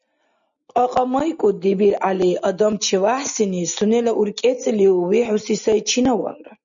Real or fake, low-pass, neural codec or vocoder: real; 7.2 kHz; none